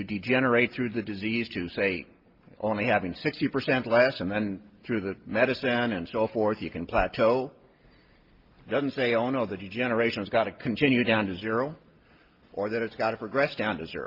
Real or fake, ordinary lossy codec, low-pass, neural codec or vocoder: real; Opus, 24 kbps; 5.4 kHz; none